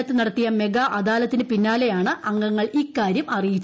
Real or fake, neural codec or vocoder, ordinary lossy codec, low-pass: real; none; none; none